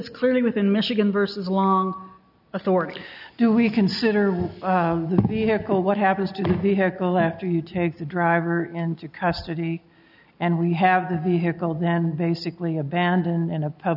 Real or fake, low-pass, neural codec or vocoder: real; 5.4 kHz; none